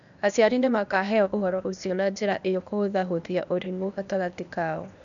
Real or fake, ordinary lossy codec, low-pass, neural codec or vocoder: fake; none; 7.2 kHz; codec, 16 kHz, 0.8 kbps, ZipCodec